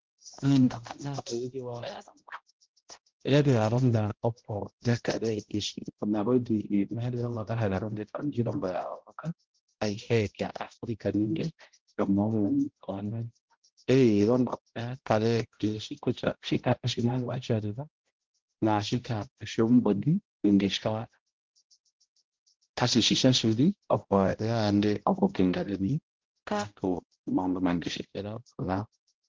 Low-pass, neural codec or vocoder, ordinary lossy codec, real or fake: 7.2 kHz; codec, 16 kHz, 0.5 kbps, X-Codec, HuBERT features, trained on balanced general audio; Opus, 16 kbps; fake